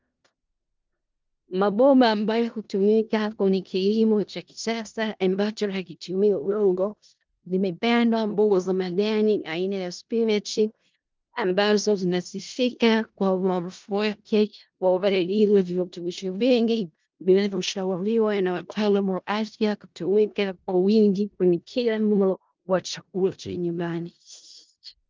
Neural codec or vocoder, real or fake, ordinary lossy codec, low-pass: codec, 16 kHz in and 24 kHz out, 0.4 kbps, LongCat-Audio-Codec, four codebook decoder; fake; Opus, 32 kbps; 7.2 kHz